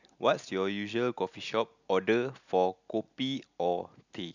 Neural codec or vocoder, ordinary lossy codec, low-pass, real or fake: none; none; 7.2 kHz; real